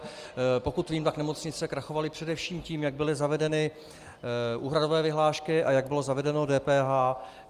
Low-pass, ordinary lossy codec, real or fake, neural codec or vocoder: 14.4 kHz; Opus, 32 kbps; real; none